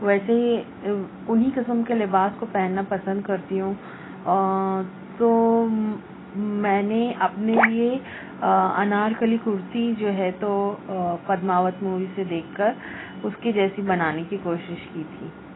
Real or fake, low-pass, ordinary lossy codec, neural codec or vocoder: real; 7.2 kHz; AAC, 16 kbps; none